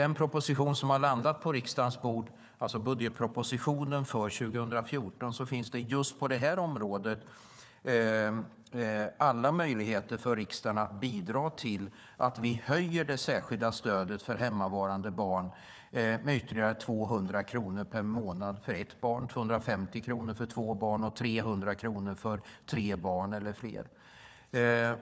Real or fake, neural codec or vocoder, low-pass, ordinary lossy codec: fake; codec, 16 kHz, 4 kbps, FunCodec, trained on Chinese and English, 50 frames a second; none; none